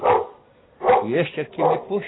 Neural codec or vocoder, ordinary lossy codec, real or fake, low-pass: none; AAC, 16 kbps; real; 7.2 kHz